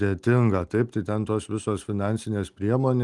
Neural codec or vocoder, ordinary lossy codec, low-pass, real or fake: none; Opus, 24 kbps; 10.8 kHz; real